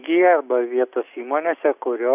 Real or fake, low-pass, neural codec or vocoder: real; 3.6 kHz; none